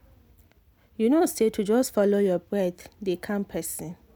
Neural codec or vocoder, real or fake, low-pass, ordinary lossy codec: none; real; none; none